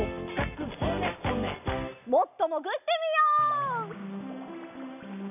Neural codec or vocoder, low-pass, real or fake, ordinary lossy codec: none; 3.6 kHz; real; none